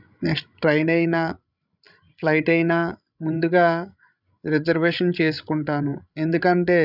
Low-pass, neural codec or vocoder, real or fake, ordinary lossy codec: 5.4 kHz; codec, 16 kHz, 16 kbps, FreqCodec, larger model; fake; none